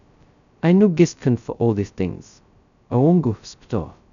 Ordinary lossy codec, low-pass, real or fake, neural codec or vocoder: none; 7.2 kHz; fake; codec, 16 kHz, 0.2 kbps, FocalCodec